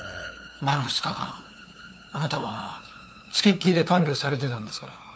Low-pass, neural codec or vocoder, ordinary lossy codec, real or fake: none; codec, 16 kHz, 2 kbps, FunCodec, trained on LibriTTS, 25 frames a second; none; fake